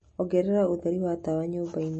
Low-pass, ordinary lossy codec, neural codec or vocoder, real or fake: 10.8 kHz; MP3, 32 kbps; none; real